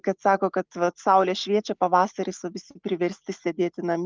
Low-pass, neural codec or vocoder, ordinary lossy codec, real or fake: 7.2 kHz; none; Opus, 24 kbps; real